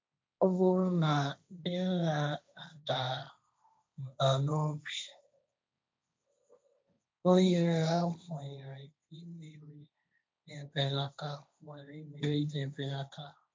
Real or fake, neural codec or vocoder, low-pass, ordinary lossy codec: fake; codec, 16 kHz, 1.1 kbps, Voila-Tokenizer; none; none